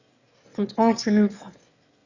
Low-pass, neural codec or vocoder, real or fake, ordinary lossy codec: 7.2 kHz; autoencoder, 22.05 kHz, a latent of 192 numbers a frame, VITS, trained on one speaker; fake; Opus, 64 kbps